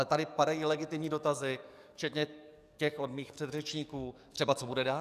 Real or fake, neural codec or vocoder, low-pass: fake; codec, 44.1 kHz, 7.8 kbps, DAC; 14.4 kHz